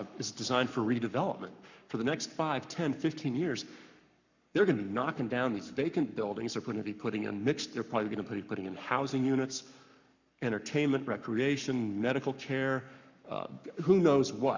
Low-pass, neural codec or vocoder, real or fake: 7.2 kHz; codec, 44.1 kHz, 7.8 kbps, Pupu-Codec; fake